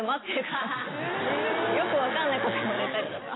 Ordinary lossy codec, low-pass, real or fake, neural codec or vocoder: AAC, 16 kbps; 7.2 kHz; real; none